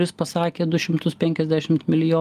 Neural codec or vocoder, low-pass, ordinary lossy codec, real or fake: none; 14.4 kHz; Opus, 32 kbps; real